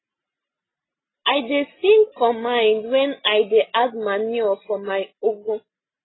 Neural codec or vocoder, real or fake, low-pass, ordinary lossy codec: none; real; 7.2 kHz; AAC, 16 kbps